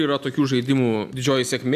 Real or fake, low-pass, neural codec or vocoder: real; 14.4 kHz; none